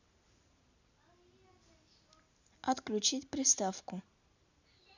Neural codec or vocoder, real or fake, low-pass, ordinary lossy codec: none; real; 7.2 kHz; none